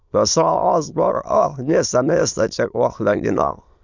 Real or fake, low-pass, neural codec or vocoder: fake; 7.2 kHz; autoencoder, 22.05 kHz, a latent of 192 numbers a frame, VITS, trained on many speakers